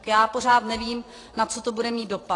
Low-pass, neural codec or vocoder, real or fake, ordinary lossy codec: 10.8 kHz; vocoder, 44.1 kHz, 128 mel bands every 512 samples, BigVGAN v2; fake; AAC, 32 kbps